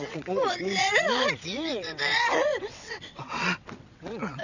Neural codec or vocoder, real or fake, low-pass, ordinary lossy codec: vocoder, 22.05 kHz, 80 mel bands, WaveNeXt; fake; 7.2 kHz; none